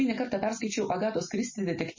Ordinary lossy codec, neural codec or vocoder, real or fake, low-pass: MP3, 32 kbps; none; real; 7.2 kHz